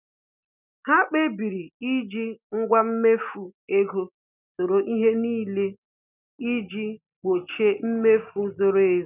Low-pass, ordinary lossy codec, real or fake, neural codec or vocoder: 3.6 kHz; none; real; none